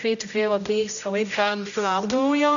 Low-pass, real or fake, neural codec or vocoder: 7.2 kHz; fake; codec, 16 kHz, 0.5 kbps, X-Codec, HuBERT features, trained on general audio